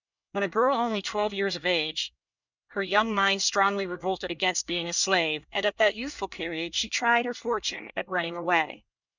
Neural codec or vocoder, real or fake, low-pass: codec, 24 kHz, 1 kbps, SNAC; fake; 7.2 kHz